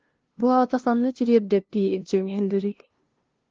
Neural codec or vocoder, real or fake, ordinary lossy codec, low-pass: codec, 16 kHz, 0.5 kbps, FunCodec, trained on LibriTTS, 25 frames a second; fake; Opus, 16 kbps; 7.2 kHz